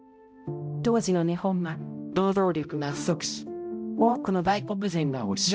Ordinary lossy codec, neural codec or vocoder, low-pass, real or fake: none; codec, 16 kHz, 0.5 kbps, X-Codec, HuBERT features, trained on balanced general audio; none; fake